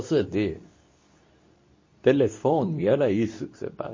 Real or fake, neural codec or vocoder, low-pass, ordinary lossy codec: fake; codec, 24 kHz, 0.9 kbps, WavTokenizer, medium speech release version 2; 7.2 kHz; MP3, 32 kbps